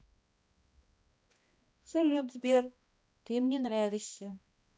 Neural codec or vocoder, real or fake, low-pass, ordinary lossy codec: codec, 16 kHz, 1 kbps, X-Codec, HuBERT features, trained on balanced general audio; fake; none; none